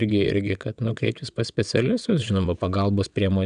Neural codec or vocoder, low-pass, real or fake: vocoder, 22.05 kHz, 80 mel bands, WaveNeXt; 9.9 kHz; fake